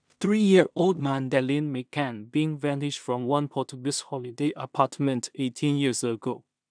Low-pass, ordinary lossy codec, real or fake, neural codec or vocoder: 9.9 kHz; none; fake; codec, 16 kHz in and 24 kHz out, 0.4 kbps, LongCat-Audio-Codec, two codebook decoder